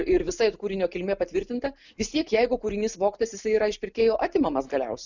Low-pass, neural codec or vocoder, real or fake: 7.2 kHz; none; real